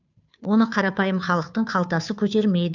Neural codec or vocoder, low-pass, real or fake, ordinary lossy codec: codec, 16 kHz, 2 kbps, FunCodec, trained on Chinese and English, 25 frames a second; 7.2 kHz; fake; none